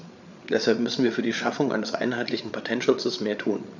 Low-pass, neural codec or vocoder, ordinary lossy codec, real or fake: 7.2 kHz; codec, 16 kHz, 8 kbps, FreqCodec, larger model; none; fake